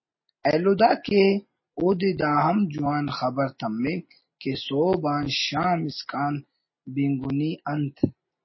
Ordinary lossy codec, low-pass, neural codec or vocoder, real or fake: MP3, 24 kbps; 7.2 kHz; none; real